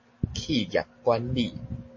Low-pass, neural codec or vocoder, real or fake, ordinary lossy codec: 7.2 kHz; none; real; MP3, 32 kbps